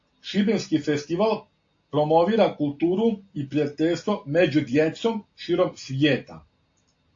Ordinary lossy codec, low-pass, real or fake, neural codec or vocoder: AAC, 48 kbps; 7.2 kHz; real; none